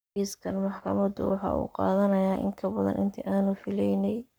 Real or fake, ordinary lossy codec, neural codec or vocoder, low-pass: fake; none; codec, 44.1 kHz, 7.8 kbps, Pupu-Codec; none